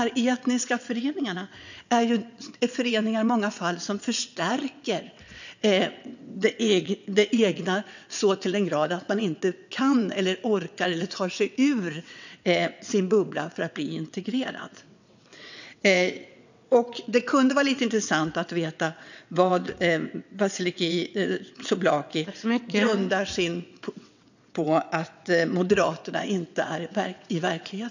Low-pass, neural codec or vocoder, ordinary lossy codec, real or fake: 7.2 kHz; vocoder, 22.05 kHz, 80 mel bands, WaveNeXt; none; fake